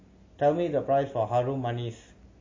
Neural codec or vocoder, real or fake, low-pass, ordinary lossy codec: none; real; 7.2 kHz; MP3, 32 kbps